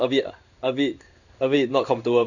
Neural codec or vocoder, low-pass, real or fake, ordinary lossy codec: codec, 16 kHz in and 24 kHz out, 1 kbps, XY-Tokenizer; 7.2 kHz; fake; none